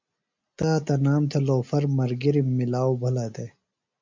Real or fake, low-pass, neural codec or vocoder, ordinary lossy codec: real; 7.2 kHz; none; MP3, 64 kbps